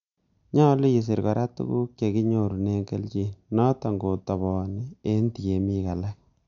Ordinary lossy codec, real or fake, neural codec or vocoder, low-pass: none; real; none; 7.2 kHz